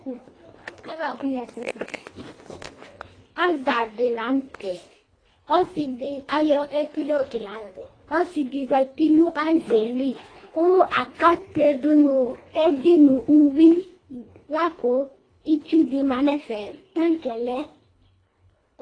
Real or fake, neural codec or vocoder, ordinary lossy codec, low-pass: fake; codec, 24 kHz, 1.5 kbps, HILCodec; AAC, 32 kbps; 9.9 kHz